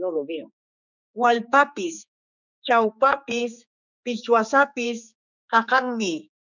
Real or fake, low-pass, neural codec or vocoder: fake; 7.2 kHz; codec, 16 kHz, 4 kbps, X-Codec, HuBERT features, trained on general audio